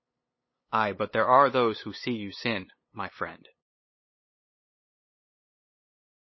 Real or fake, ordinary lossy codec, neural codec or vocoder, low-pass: fake; MP3, 24 kbps; codec, 16 kHz, 8 kbps, FunCodec, trained on LibriTTS, 25 frames a second; 7.2 kHz